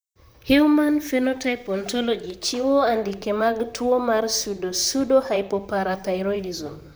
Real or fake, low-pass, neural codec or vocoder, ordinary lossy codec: fake; none; vocoder, 44.1 kHz, 128 mel bands, Pupu-Vocoder; none